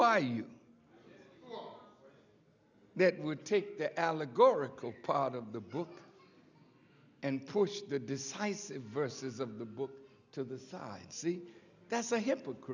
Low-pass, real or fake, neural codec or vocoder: 7.2 kHz; real; none